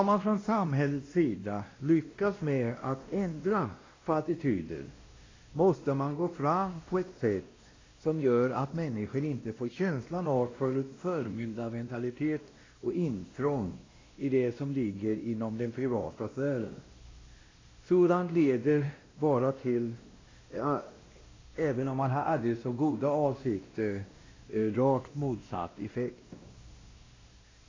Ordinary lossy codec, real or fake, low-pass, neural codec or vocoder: AAC, 32 kbps; fake; 7.2 kHz; codec, 16 kHz, 1 kbps, X-Codec, WavLM features, trained on Multilingual LibriSpeech